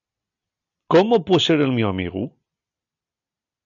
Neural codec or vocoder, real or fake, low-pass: none; real; 7.2 kHz